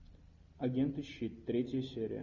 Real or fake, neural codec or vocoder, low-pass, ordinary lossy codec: real; none; 7.2 kHz; Opus, 64 kbps